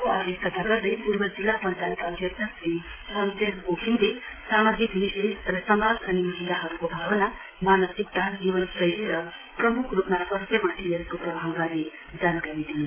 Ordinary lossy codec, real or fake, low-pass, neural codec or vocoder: MP3, 16 kbps; fake; 3.6 kHz; codec, 16 kHz, 16 kbps, FreqCodec, smaller model